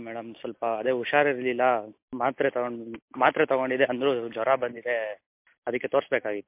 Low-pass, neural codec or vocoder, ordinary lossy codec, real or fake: 3.6 kHz; none; MP3, 32 kbps; real